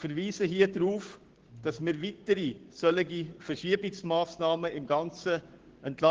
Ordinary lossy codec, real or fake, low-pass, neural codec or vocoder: Opus, 16 kbps; fake; 7.2 kHz; codec, 16 kHz, 6 kbps, DAC